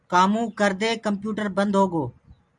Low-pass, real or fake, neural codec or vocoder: 10.8 kHz; fake; vocoder, 44.1 kHz, 128 mel bands every 256 samples, BigVGAN v2